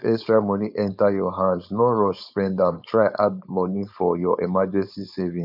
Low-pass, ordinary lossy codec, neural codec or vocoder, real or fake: 5.4 kHz; none; codec, 16 kHz, 4.8 kbps, FACodec; fake